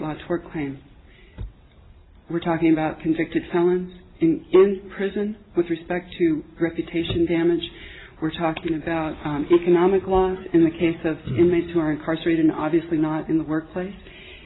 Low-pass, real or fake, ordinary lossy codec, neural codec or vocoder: 7.2 kHz; real; AAC, 16 kbps; none